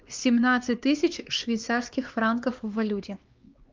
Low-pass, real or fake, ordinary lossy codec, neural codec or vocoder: 7.2 kHz; fake; Opus, 24 kbps; codec, 16 kHz, 2 kbps, X-Codec, HuBERT features, trained on LibriSpeech